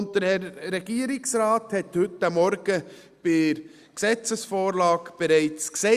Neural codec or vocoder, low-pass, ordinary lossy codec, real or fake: none; 14.4 kHz; Opus, 64 kbps; real